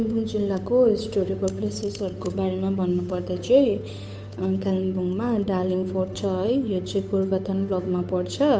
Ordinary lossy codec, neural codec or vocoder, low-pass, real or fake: none; codec, 16 kHz, 8 kbps, FunCodec, trained on Chinese and English, 25 frames a second; none; fake